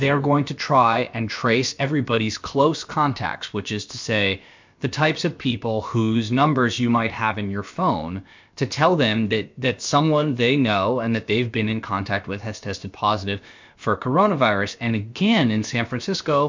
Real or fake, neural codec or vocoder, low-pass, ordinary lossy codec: fake; codec, 16 kHz, about 1 kbps, DyCAST, with the encoder's durations; 7.2 kHz; MP3, 64 kbps